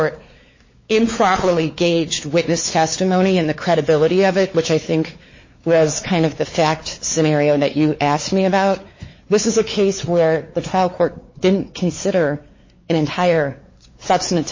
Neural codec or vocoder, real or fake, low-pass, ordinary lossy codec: codec, 16 kHz, 4 kbps, X-Codec, WavLM features, trained on Multilingual LibriSpeech; fake; 7.2 kHz; MP3, 32 kbps